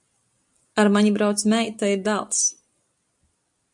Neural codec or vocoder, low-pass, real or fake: none; 10.8 kHz; real